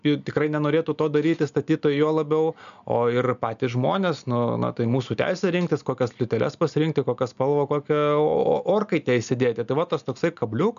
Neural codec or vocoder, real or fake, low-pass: none; real; 7.2 kHz